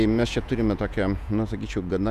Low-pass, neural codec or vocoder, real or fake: 14.4 kHz; vocoder, 48 kHz, 128 mel bands, Vocos; fake